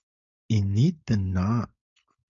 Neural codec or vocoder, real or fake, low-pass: codec, 16 kHz, 4.8 kbps, FACodec; fake; 7.2 kHz